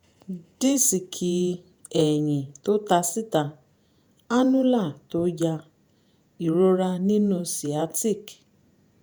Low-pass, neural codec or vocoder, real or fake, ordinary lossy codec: none; vocoder, 48 kHz, 128 mel bands, Vocos; fake; none